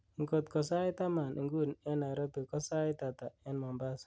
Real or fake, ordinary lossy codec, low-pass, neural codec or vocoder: real; none; none; none